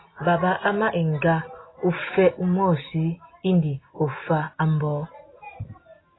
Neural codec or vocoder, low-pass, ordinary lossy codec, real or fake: none; 7.2 kHz; AAC, 16 kbps; real